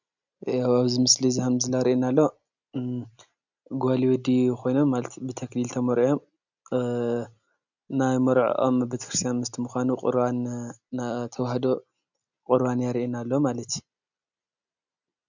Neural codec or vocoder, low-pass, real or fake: none; 7.2 kHz; real